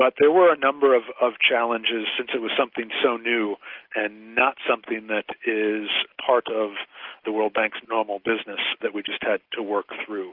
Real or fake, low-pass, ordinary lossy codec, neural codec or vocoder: real; 5.4 kHz; Opus, 32 kbps; none